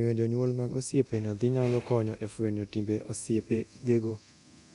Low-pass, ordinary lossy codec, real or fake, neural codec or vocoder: 10.8 kHz; none; fake; codec, 24 kHz, 0.9 kbps, DualCodec